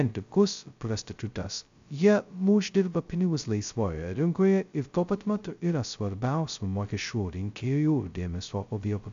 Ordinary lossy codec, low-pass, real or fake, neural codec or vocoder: AAC, 64 kbps; 7.2 kHz; fake; codec, 16 kHz, 0.2 kbps, FocalCodec